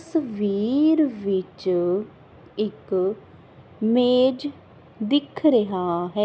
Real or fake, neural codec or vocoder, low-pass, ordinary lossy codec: real; none; none; none